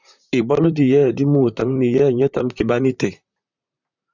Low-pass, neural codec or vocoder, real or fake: 7.2 kHz; vocoder, 44.1 kHz, 128 mel bands, Pupu-Vocoder; fake